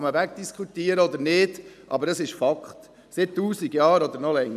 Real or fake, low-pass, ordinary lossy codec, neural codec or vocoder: real; 14.4 kHz; none; none